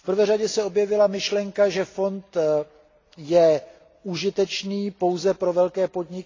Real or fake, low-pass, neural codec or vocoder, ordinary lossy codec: real; 7.2 kHz; none; AAC, 32 kbps